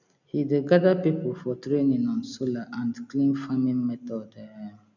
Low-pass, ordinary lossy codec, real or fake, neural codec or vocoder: 7.2 kHz; none; real; none